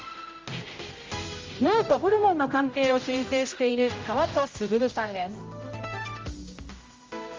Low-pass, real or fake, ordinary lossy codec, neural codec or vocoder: 7.2 kHz; fake; Opus, 32 kbps; codec, 16 kHz, 0.5 kbps, X-Codec, HuBERT features, trained on general audio